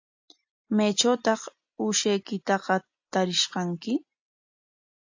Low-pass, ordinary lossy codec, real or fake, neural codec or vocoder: 7.2 kHz; AAC, 48 kbps; real; none